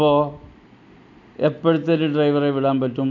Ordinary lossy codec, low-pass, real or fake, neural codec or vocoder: none; 7.2 kHz; real; none